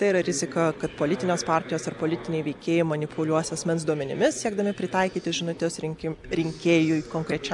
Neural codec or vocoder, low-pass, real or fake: none; 10.8 kHz; real